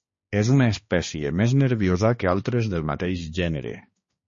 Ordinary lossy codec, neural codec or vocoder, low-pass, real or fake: MP3, 32 kbps; codec, 16 kHz, 2 kbps, X-Codec, HuBERT features, trained on balanced general audio; 7.2 kHz; fake